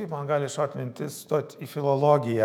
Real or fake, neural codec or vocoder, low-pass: fake; autoencoder, 48 kHz, 128 numbers a frame, DAC-VAE, trained on Japanese speech; 19.8 kHz